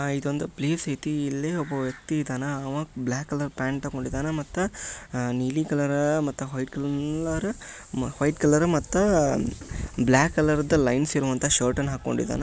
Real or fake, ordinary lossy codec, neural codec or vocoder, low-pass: real; none; none; none